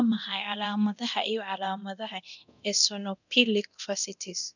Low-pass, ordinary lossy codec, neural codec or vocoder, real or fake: 7.2 kHz; none; codec, 24 kHz, 0.9 kbps, DualCodec; fake